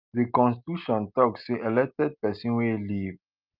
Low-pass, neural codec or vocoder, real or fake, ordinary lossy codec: 5.4 kHz; none; real; Opus, 24 kbps